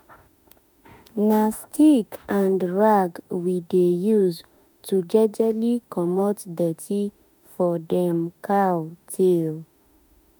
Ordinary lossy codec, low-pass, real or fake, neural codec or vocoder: none; none; fake; autoencoder, 48 kHz, 32 numbers a frame, DAC-VAE, trained on Japanese speech